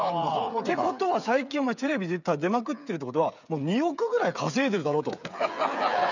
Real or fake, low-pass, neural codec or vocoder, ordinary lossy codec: fake; 7.2 kHz; codec, 16 kHz, 8 kbps, FreqCodec, smaller model; none